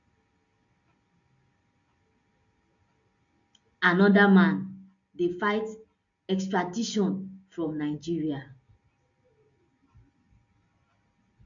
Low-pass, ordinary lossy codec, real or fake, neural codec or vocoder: 7.2 kHz; none; real; none